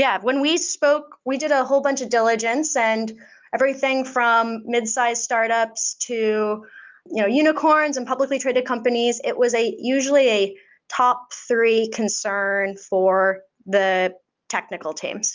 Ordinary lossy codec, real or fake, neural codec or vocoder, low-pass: Opus, 32 kbps; real; none; 7.2 kHz